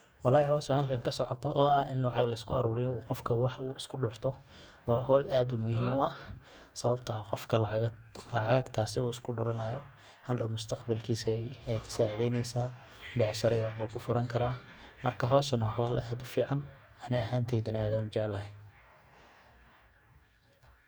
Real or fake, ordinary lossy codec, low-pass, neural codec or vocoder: fake; none; none; codec, 44.1 kHz, 2.6 kbps, DAC